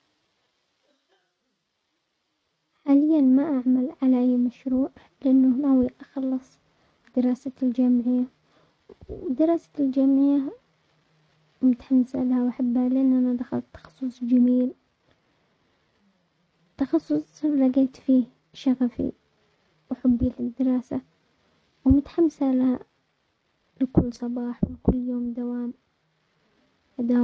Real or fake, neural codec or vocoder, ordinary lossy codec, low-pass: real; none; none; none